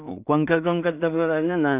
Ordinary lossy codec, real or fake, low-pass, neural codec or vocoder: none; fake; 3.6 kHz; codec, 16 kHz in and 24 kHz out, 0.4 kbps, LongCat-Audio-Codec, two codebook decoder